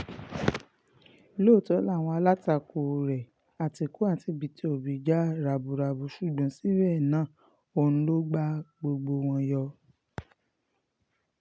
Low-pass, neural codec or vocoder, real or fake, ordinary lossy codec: none; none; real; none